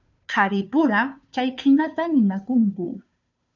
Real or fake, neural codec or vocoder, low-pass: fake; codec, 16 kHz, 2 kbps, FunCodec, trained on Chinese and English, 25 frames a second; 7.2 kHz